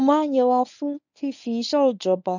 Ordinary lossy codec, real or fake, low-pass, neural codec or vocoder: none; fake; 7.2 kHz; codec, 24 kHz, 0.9 kbps, WavTokenizer, medium speech release version 1